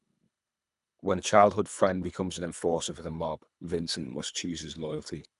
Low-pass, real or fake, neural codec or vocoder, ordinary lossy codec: 10.8 kHz; fake; codec, 24 kHz, 3 kbps, HILCodec; none